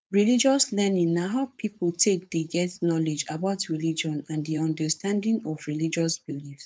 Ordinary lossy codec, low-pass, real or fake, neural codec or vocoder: none; none; fake; codec, 16 kHz, 4.8 kbps, FACodec